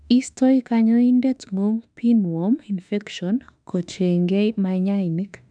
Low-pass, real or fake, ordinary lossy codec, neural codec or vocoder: 9.9 kHz; fake; none; autoencoder, 48 kHz, 32 numbers a frame, DAC-VAE, trained on Japanese speech